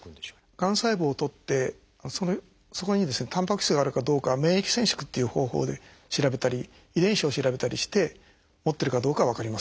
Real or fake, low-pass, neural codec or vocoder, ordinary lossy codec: real; none; none; none